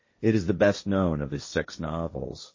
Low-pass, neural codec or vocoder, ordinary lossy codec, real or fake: 7.2 kHz; codec, 16 kHz, 1.1 kbps, Voila-Tokenizer; MP3, 32 kbps; fake